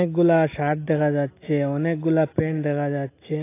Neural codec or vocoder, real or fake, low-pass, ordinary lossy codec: none; real; 3.6 kHz; AAC, 24 kbps